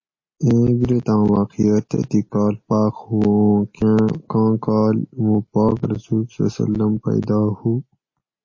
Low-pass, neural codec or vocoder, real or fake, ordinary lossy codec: 7.2 kHz; none; real; MP3, 32 kbps